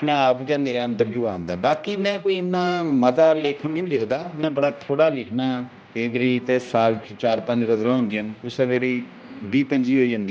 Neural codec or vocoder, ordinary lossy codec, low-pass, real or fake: codec, 16 kHz, 1 kbps, X-Codec, HuBERT features, trained on general audio; none; none; fake